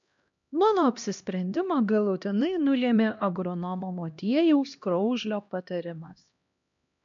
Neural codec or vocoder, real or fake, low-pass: codec, 16 kHz, 1 kbps, X-Codec, HuBERT features, trained on LibriSpeech; fake; 7.2 kHz